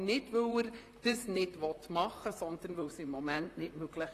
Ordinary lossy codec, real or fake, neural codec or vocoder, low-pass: AAC, 48 kbps; fake; vocoder, 44.1 kHz, 128 mel bands, Pupu-Vocoder; 14.4 kHz